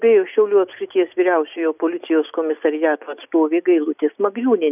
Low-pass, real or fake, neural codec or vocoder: 3.6 kHz; real; none